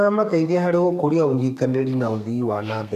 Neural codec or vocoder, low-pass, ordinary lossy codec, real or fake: codec, 32 kHz, 1.9 kbps, SNAC; 14.4 kHz; none; fake